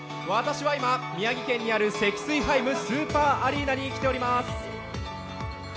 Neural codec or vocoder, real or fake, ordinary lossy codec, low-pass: none; real; none; none